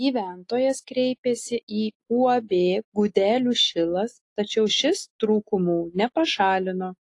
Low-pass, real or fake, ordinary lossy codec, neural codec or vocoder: 10.8 kHz; real; AAC, 48 kbps; none